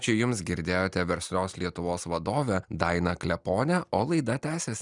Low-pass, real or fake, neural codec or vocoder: 10.8 kHz; real; none